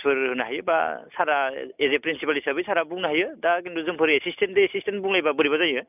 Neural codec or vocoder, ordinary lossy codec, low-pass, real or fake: none; none; 3.6 kHz; real